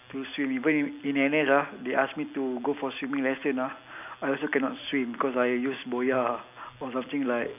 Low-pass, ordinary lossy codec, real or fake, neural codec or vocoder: 3.6 kHz; none; real; none